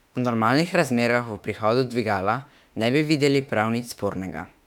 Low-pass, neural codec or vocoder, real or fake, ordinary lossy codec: 19.8 kHz; autoencoder, 48 kHz, 32 numbers a frame, DAC-VAE, trained on Japanese speech; fake; none